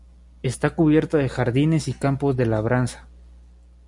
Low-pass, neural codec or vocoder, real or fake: 10.8 kHz; none; real